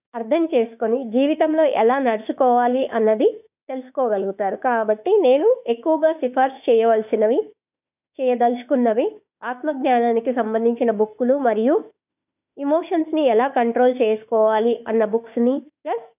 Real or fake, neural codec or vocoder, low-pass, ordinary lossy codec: fake; autoencoder, 48 kHz, 32 numbers a frame, DAC-VAE, trained on Japanese speech; 3.6 kHz; none